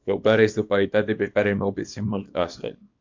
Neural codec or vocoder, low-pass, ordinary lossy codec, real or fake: codec, 24 kHz, 0.9 kbps, WavTokenizer, small release; 7.2 kHz; MP3, 48 kbps; fake